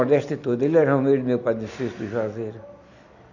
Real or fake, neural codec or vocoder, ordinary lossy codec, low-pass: real; none; none; 7.2 kHz